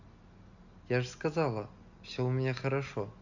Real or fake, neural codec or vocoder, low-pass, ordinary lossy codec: real; none; 7.2 kHz; none